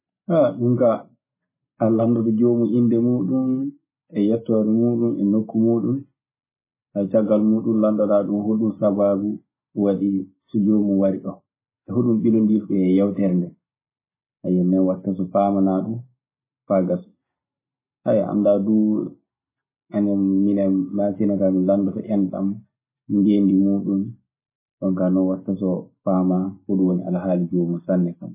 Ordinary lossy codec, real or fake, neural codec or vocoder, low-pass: MP3, 16 kbps; real; none; 3.6 kHz